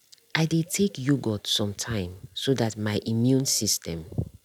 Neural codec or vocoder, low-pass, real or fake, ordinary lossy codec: none; 19.8 kHz; real; none